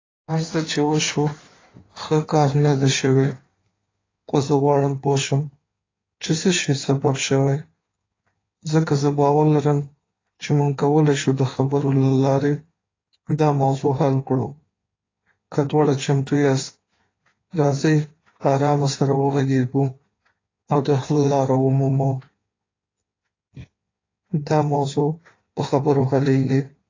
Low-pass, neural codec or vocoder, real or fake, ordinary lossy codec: 7.2 kHz; codec, 16 kHz in and 24 kHz out, 1.1 kbps, FireRedTTS-2 codec; fake; AAC, 32 kbps